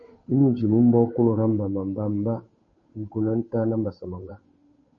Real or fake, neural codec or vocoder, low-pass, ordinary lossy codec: fake; codec, 16 kHz, 8 kbps, FunCodec, trained on Chinese and English, 25 frames a second; 7.2 kHz; MP3, 32 kbps